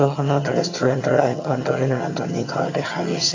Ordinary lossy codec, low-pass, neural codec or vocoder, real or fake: AAC, 32 kbps; 7.2 kHz; vocoder, 22.05 kHz, 80 mel bands, HiFi-GAN; fake